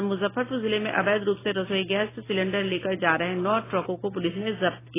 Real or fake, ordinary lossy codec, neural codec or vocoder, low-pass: real; AAC, 16 kbps; none; 3.6 kHz